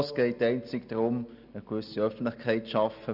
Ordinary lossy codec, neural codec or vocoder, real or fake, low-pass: none; none; real; 5.4 kHz